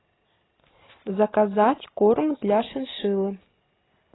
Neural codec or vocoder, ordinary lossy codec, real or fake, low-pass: none; AAC, 16 kbps; real; 7.2 kHz